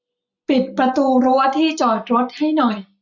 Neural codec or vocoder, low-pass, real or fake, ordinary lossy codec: none; 7.2 kHz; real; none